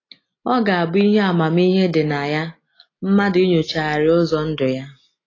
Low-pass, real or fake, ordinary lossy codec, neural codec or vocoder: 7.2 kHz; real; AAC, 32 kbps; none